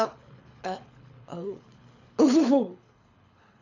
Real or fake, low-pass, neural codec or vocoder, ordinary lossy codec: fake; 7.2 kHz; codec, 24 kHz, 6 kbps, HILCodec; none